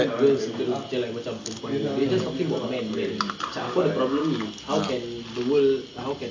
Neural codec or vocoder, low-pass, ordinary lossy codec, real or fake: none; 7.2 kHz; AAC, 48 kbps; real